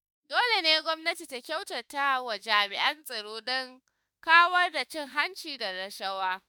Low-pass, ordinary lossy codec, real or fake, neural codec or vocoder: none; none; fake; autoencoder, 48 kHz, 32 numbers a frame, DAC-VAE, trained on Japanese speech